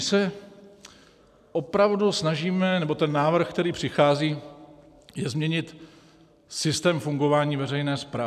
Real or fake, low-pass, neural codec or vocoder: real; 14.4 kHz; none